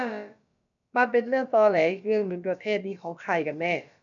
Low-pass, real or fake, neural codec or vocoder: 7.2 kHz; fake; codec, 16 kHz, about 1 kbps, DyCAST, with the encoder's durations